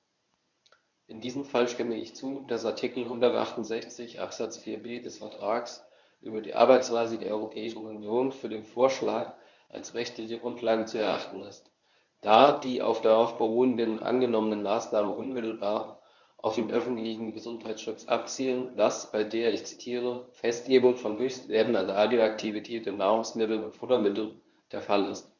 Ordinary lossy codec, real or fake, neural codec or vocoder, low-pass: none; fake; codec, 24 kHz, 0.9 kbps, WavTokenizer, medium speech release version 1; 7.2 kHz